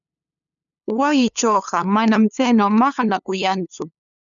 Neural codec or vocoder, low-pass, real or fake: codec, 16 kHz, 2 kbps, FunCodec, trained on LibriTTS, 25 frames a second; 7.2 kHz; fake